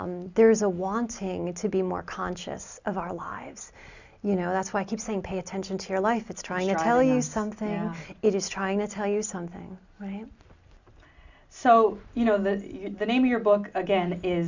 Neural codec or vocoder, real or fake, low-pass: none; real; 7.2 kHz